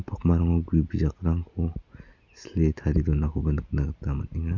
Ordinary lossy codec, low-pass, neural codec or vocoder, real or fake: none; 7.2 kHz; none; real